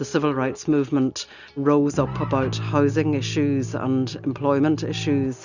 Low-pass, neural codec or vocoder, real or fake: 7.2 kHz; none; real